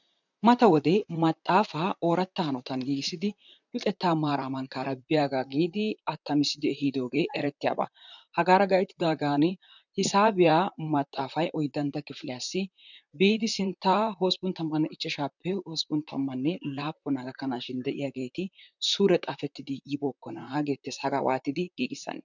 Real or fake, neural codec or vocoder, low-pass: fake; vocoder, 44.1 kHz, 128 mel bands, Pupu-Vocoder; 7.2 kHz